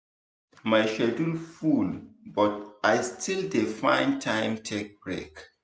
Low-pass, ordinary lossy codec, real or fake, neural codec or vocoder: none; none; real; none